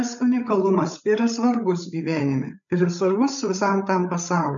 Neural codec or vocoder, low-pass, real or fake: codec, 16 kHz, 8 kbps, FreqCodec, larger model; 7.2 kHz; fake